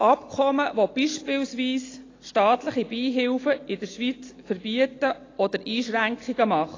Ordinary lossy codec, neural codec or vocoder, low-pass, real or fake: AAC, 32 kbps; none; 7.2 kHz; real